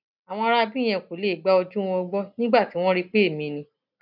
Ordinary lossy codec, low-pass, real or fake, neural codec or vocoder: none; 5.4 kHz; real; none